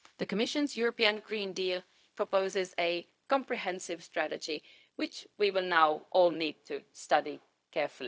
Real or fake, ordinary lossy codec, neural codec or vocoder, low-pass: fake; none; codec, 16 kHz, 0.4 kbps, LongCat-Audio-Codec; none